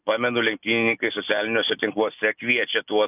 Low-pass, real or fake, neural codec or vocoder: 3.6 kHz; real; none